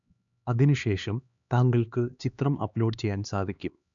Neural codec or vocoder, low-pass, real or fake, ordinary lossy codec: codec, 16 kHz, 2 kbps, X-Codec, HuBERT features, trained on LibriSpeech; 7.2 kHz; fake; none